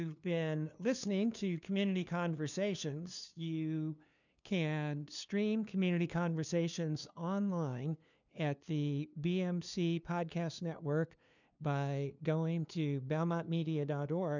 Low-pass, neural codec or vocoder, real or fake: 7.2 kHz; codec, 16 kHz, 2 kbps, FunCodec, trained on LibriTTS, 25 frames a second; fake